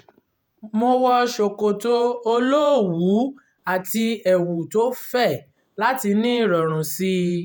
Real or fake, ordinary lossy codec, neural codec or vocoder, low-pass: fake; none; vocoder, 48 kHz, 128 mel bands, Vocos; none